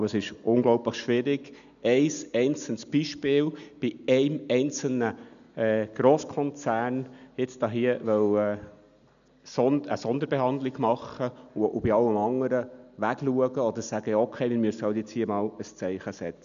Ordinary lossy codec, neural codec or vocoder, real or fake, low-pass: none; none; real; 7.2 kHz